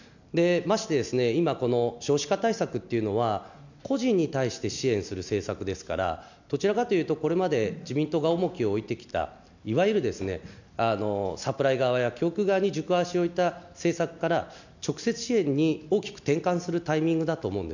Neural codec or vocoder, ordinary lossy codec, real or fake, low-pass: none; none; real; 7.2 kHz